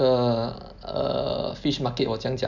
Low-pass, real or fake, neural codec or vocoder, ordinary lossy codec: 7.2 kHz; real; none; none